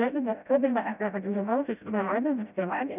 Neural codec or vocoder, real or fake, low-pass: codec, 16 kHz, 0.5 kbps, FreqCodec, smaller model; fake; 3.6 kHz